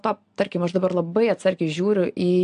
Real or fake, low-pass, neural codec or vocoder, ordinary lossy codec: real; 9.9 kHz; none; AAC, 64 kbps